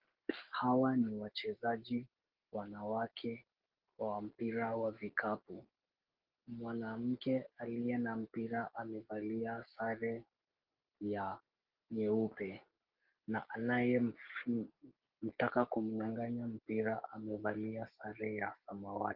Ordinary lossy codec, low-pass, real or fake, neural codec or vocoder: Opus, 16 kbps; 5.4 kHz; real; none